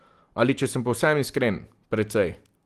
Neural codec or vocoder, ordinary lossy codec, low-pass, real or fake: none; Opus, 24 kbps; 14.4 kHz; real